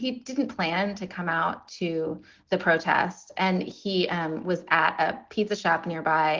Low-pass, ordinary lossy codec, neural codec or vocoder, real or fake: 7.2 kHz; Opus, 16 kbps; none; real